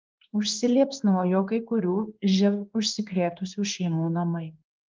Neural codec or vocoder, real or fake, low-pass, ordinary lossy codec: codec, 16 kHz in and 24 kHz out, 1 kbps, XY-Tokenizer; fake; 7.2 kHz; Opus, 24 kbps